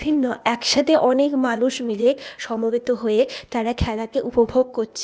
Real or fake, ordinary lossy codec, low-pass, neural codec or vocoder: fake; none; none; codec, 16 kHz, 0.8 kbps, ZipCodec